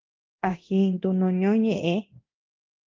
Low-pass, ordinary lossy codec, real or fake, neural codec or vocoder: 7.2 kHz; Opus, 32 kbps; fake; codec, 24 kHz, 0.9 kbps, DualCodec